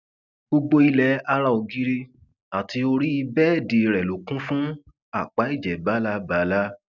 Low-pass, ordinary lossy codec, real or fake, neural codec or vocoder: 7.2 kHz; none; real; none